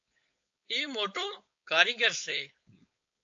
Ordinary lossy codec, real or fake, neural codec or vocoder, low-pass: AAC, 64 kbps; fake; codec, 16 kHz, 4.8 kbps, FACodec; 7.2 kHz